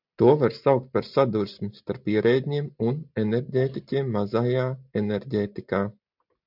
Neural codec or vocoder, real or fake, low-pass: none; real; 5.4 kHz